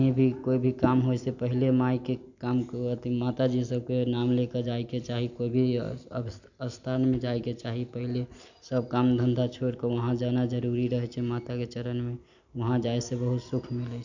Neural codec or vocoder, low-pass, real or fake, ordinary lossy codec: none; 7.2 kHz; real; none